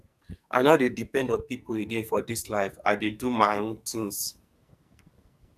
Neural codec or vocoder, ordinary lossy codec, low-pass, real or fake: codec, 44.1 kHz, 2.6 kbps, SNAC; none; 14.4 kHz; fake